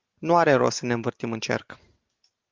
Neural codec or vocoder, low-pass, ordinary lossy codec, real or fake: none; 7.2 kHz; Opus, 32 kbps; real